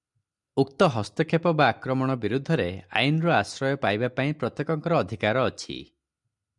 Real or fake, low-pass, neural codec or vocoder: real; 10.8 kHz; none